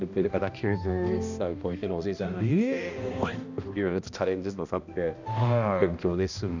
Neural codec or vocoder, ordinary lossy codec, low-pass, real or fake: codec, 16 kHz, 1 kbps, X-Codec, HuBERT features, trained on balanced general audio; none; 7.2 kHz; fake